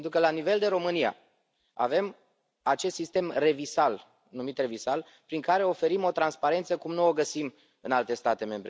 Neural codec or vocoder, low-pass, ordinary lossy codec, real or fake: none; none; none; real